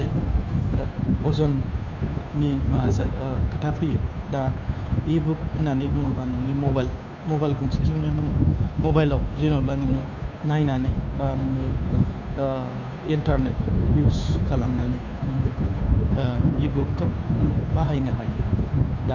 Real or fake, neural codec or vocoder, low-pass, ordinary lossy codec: fake; codec, 16 kHz, 2 kbps, FunCodec, trained on Chinese and English, 25 frames a second; 7.2 kHz; none